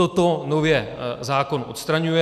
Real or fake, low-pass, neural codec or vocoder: real; 14.4 kHz; none